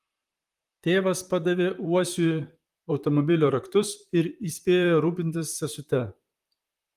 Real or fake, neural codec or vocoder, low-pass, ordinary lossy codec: fake; vocoder, 44.1 kHz, 128 mel bands, Pupu-Vocoder; 14.4 kHz; Opus, 32 kbps